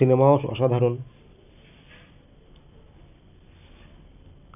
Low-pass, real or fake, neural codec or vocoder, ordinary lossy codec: 3.6 kHz; real; none; none